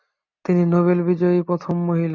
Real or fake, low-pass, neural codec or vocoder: real; 7.2 kHz; none